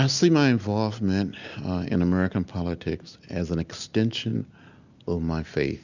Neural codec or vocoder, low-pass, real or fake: none; 7.2 kHz; real